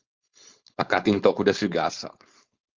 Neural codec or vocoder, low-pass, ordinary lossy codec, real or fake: codec, 16 kHz, 4.8 kbps, FACodec; 7.2 kHz; Opus, 32 kbps; fake